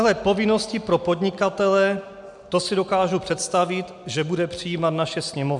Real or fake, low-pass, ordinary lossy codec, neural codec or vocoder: real; 10.8 kHz; MP3, 96 kbps; none